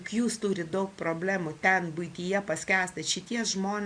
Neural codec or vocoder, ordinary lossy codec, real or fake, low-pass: none; MP3, 96 kbps; real; 9.9 kHz